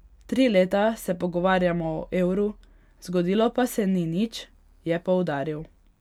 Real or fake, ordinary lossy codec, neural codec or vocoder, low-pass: real; none; none; 19.8 kHz